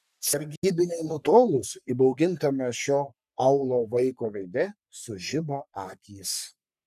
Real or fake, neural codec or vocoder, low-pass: fake; codec, 44.1 kHz, 3.4 kbps, Pupu-Codec; 14.4 kHz